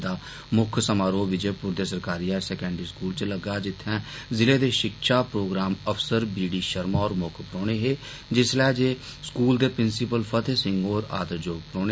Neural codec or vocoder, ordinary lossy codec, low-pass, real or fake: none; none; none; real